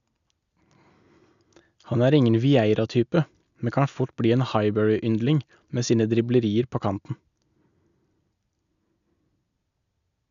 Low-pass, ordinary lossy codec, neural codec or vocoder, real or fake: 7.2 kHz; none; none; real